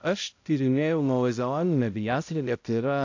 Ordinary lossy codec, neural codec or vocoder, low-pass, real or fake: AAC, 48 kbps; codec, 16 kHz, 0.5 kbps, X-Codec, HuBERT features, trained on balanced general audio; 7.2 kHz; fake